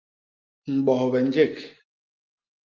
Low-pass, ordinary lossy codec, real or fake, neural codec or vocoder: 7.2 kHz; Opus, 32 kbps; real; none